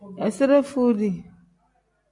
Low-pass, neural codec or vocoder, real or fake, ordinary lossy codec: 10.8 kHz; none; real; MP3, 96 kbps